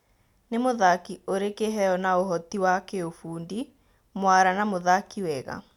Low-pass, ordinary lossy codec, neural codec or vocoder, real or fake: 19.8 kHz; none; none; real